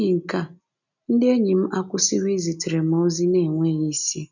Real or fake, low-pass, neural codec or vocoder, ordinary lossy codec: real; 7.2 kHz; none; none